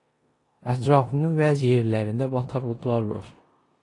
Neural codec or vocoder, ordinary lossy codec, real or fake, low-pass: codec, 16 kHz in and 24 kHz out, 0.9 kbps, LongCat-Audio-Codec, four codebook decoder; AAC, 32 kbps; fake; 10.8 kHz